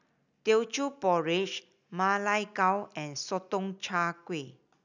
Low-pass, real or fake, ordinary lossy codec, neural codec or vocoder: 7.2 kHz; real; none; none